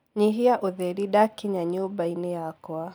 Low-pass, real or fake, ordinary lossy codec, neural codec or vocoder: none; real; none; none